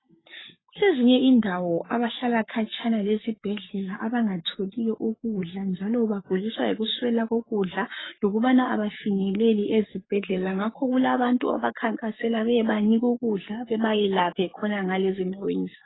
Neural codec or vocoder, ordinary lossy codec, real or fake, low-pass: codec, 44.1 kHz, 7.8 kbps, Pupu-Codec; AAC, 16 kbps; fake; 7.2 kHz